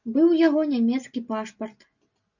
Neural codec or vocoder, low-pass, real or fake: none; 7.2 kHz; real